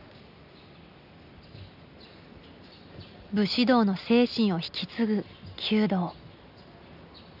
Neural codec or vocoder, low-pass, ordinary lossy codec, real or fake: none; 5.4 kHz; none; real